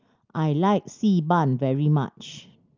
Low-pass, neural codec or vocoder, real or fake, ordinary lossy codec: 7.2 kHz; none; real; Opus, 32 kbps